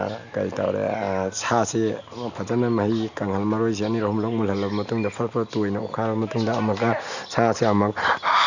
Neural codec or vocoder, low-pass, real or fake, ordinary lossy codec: none; 7.2 kHz; real; none